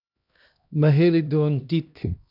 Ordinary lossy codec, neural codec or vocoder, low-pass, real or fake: none; codec, 16 kHz, 1 kbps, X-Codec, HuBERT features, trained on LibriSpeech; 5.4 kHz; fake